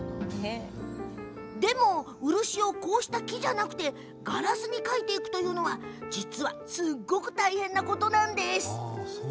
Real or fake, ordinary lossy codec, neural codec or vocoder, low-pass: real; none; none; none